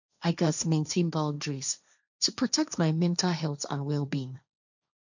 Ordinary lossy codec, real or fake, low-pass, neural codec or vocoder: none; fake; 7.2 kHz; codec, 16 kHz, 1.1 kbps, Voila-Tokenizer